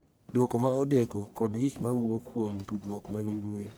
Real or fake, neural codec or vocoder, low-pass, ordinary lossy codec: fake; codec, 44.1 kHz, 1.7 kbps, Pupu-Codec; none; none